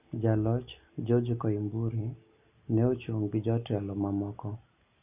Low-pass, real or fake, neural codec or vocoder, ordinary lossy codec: 3.6 kHz; real; none; none